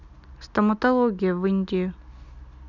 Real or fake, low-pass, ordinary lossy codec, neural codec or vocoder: real; 7.2 kHz; none; none